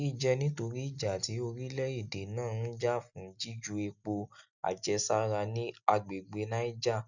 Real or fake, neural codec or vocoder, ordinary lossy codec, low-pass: real; none; none; 7.2 kHz